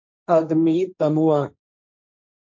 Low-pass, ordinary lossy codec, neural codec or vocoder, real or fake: 7.2 kHz; MP3, 48 kbps; codec, 16 kHz, 1.1 kbps, Voila-Tokenizer; fake